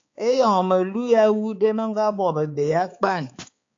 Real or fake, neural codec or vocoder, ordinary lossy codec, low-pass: fake; codec, 16 kHz, 4 kbps, X-Codec, HuBERT features, trained on balanced general audio; AAC, 48 kbps; 7.2 kHz